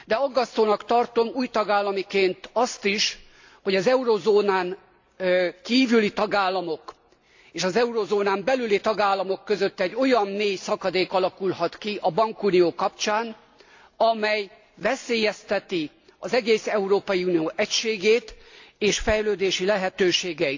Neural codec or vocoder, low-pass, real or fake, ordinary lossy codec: none; 7.2 kHz; real; AAC, 48 kbps